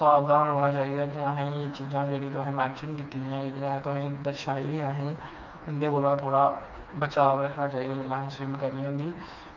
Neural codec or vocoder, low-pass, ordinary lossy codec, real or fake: codec, 16 kHz, 2 kbps, FreqCodec, smaller model; 7.2 kHz; none; fake